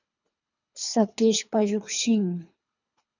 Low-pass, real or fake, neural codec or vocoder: 7.2 kHz; fake; codec, 24 kHz, 3 kbps, HILCodec